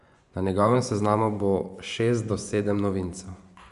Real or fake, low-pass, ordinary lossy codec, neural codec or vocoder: fake; 10.8 kHz; none; vocoder, 24 kHz, 100 mel bands, Vocos